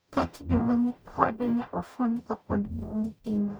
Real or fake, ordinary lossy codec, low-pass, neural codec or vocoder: fake; none; none; codec, 44.1 kHz, 0.9 kbps, DAC